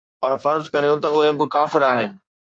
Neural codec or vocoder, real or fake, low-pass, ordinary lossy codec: codec, 16 kHz in and 24 kHz out, 1.1 kbps, FireRedTTS-2 codec; fake; 9.9 kHz; AAC, 64 kbps